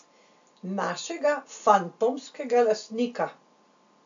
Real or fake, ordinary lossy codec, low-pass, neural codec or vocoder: real; AAC, 64 kbps; 7.2 kHz; none